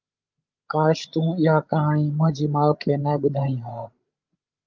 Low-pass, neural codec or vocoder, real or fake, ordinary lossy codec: 7.2 kHz; codec, 16 kHz, 16 kbps, FreqCodec, larger model; fake; Opus, 24 kbps